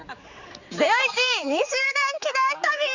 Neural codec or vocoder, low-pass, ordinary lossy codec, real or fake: codec, 16 kHz, 4 kbps, X-Codec, HuBERT features, trained on general audio; 7.2 kHz; none; fake